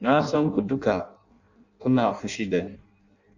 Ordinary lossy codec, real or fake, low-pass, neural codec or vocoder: Opus, 64 kbps; fake; 7.2 kHz; codec, 16 kHz in and 24 kHz out, 0.6 kbps, FireRedTTS-2 codec